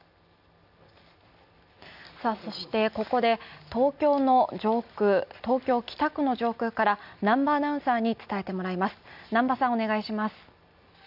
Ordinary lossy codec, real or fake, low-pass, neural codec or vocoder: none; real; 5.4 kHz; none